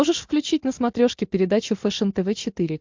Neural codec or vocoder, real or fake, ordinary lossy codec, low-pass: none; real; MP3, 64 kbps; 7.2 kHz